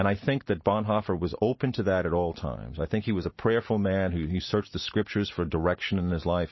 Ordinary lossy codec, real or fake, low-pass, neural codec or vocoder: MP3, 24 kbps; real; 7.2 kHz; none